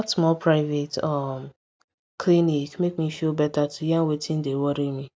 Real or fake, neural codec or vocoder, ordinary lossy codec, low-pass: real; none; none; none